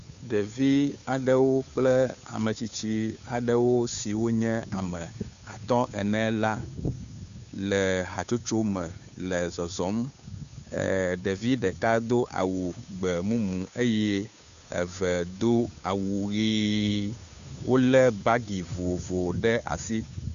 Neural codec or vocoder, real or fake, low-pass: codec, 16 kHz, 2 kbps, FunCodec, trained on Chinese and English, 25 frames a second; fake; 7.2 kHz